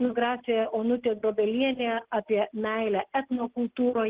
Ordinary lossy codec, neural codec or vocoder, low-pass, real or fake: Opus, 16 kbps; none; 3.6 kHz; real